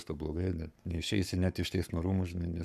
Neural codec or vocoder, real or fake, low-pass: codec, 44.1 kHz, 7.8 kbps, Pupu-Codec; fake; 14.4 kHz